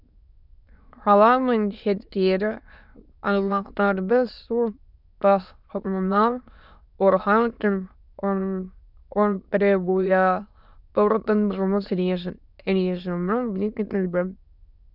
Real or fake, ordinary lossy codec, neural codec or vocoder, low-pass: fake; none; autoencoder, 22.05 kHz, a latent of 192 numbers a frame, VITS, trained on many speakers; 5.4 kHz